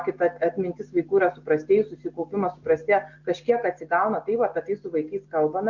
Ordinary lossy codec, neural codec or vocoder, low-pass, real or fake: Opus, 64 kbps; none; 7.2 kHz; real